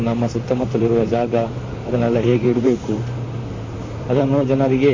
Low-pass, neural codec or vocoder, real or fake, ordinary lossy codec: 7.2 kHz; vocoder, 44.1 kHz, 128 mel bands, Pupu-Vocoder; fake; MP3, 32 kbps